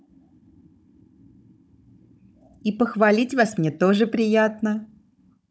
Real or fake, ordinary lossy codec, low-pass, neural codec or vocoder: fake; none; none; codec, 16 kHz, 16 kbps, FunCodec, trained on Chinese and English, 50 frames a second